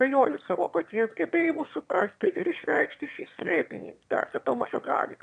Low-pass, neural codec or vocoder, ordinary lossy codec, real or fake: 9.9 kHz; autoencoder, 22.05 kHz, a latent of 192 numbers a frame, VITS, trained on one speaker; AAC, 96 kbps; fake